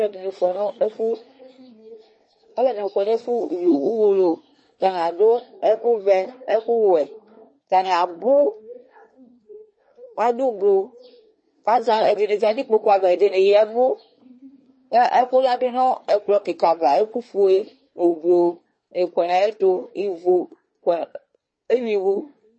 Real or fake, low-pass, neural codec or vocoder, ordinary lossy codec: fake; 9.9 kHz; codec, 24 kHz, 1 kbps, SNAC; MP3, 32 kbps